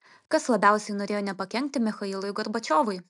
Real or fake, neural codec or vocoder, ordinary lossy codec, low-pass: real; none; MP3, 96 kbps; 10.8 kHz